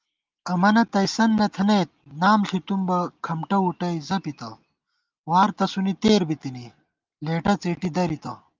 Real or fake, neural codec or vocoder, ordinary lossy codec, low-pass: real; none; Opus, 24 kbps; 7.2 kHz